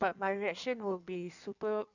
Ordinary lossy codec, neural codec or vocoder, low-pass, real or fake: none; codec, 16 kHz in and 24 kHz out, 1.1 kbps, FireRedTTS-2 codec; 7.2 kHz; fake